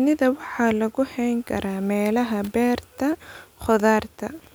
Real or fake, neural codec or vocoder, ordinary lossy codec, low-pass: real; none; none; none